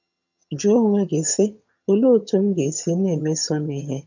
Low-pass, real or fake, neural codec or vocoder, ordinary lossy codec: 7.2 kHz; fake; vocoder, 22.05 kHz, 80 mel bands, HiFi-GAN; none